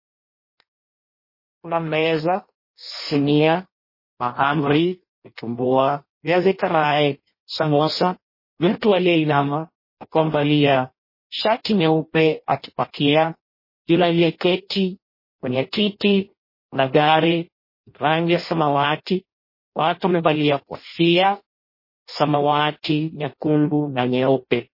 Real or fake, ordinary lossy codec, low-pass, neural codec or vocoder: fake; MP3, 24 kbps; 5.4 kHz; codec, 16 kHz in and 24 kHz out, 0.6 kbps, FireRedTTS-2 codec